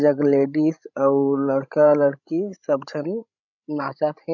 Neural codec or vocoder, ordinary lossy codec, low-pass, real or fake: codec, 16 kHz, 16 kbps, FreqCodec, larger model; none; 7.2 kHz; fake